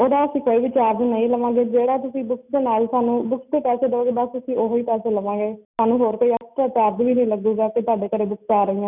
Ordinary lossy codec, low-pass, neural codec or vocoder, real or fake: none; 3.6 kHz; none; real